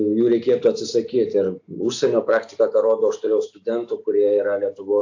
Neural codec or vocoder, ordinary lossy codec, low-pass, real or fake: none; AAC, 48 kbps; 7.2 kHz; real